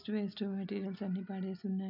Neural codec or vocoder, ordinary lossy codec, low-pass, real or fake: none; none; 5.4 kHz; real